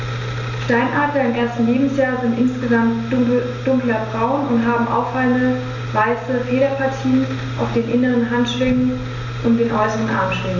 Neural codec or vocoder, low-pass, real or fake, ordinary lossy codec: none; 7.2 kHz; real; none